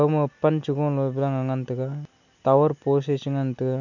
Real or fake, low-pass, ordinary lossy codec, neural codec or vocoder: real; 7.2 kHz; none; none